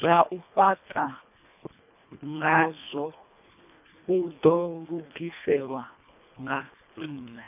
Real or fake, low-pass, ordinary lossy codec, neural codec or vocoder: fake; 3.6 kHz; none; codec, 24 kHz, 1.5 kbps, HILCodec